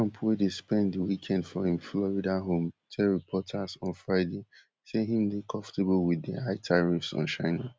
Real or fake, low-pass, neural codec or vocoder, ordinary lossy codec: real; none; none; none